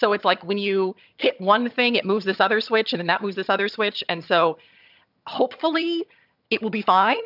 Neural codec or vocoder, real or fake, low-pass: vocoder, 22.05 kHz, 80 mel bands, HiFi-GAN; fake; 5.4 kHz